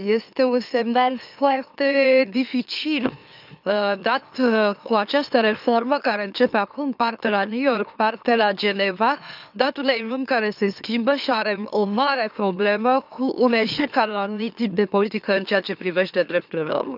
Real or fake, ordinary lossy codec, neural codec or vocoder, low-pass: fake; none; autoencoder, 44.1 kHz, a latent of 192 numbers a frame, MeloTTS; 5.4 kHz